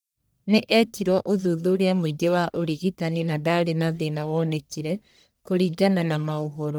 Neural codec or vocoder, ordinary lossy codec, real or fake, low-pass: codec, 44.1 kHz, 1.7 kbps, Pupu-Codec; none; fake; none